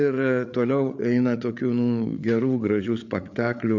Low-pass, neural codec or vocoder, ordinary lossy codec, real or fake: 7.2 kHz; codec, 16 kHz, 8 kbps, FreqCodec, larger model; MP3, 64 kbps; fake